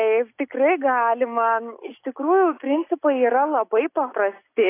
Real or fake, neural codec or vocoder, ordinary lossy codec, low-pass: real; none; AAC, 24 kbps; 3.6 kHz